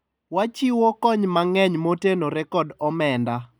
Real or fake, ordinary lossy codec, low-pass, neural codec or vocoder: real; none; none; none